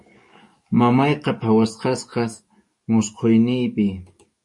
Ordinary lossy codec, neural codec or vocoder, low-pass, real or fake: MP3, 48 kbps; codec, 44.1 kHz, 7.8 kbps, DAC; 10.8 kHz; fake